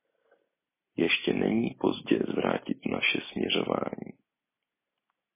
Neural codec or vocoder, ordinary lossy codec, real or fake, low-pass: none; MP3, 16 kbps; real; 3.6 kHz